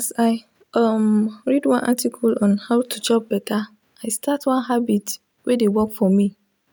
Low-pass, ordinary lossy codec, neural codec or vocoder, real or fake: none; none; none; real